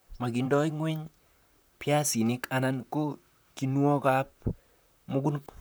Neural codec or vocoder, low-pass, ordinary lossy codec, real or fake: vocoder, 44.1 kHz, 128 mel bands, Pupu-Vocoder; none; none; fake